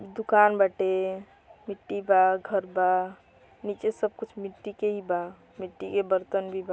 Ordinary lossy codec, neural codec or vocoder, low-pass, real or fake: none; none; none; real